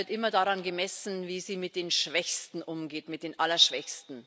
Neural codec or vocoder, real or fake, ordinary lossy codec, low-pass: none; real; none; none